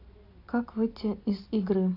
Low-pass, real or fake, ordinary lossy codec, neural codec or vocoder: 5.4 kHz; real; AAC, 32 kbps; none